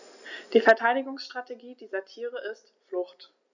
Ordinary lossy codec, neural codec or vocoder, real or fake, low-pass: none; none; real; 7.2 kHz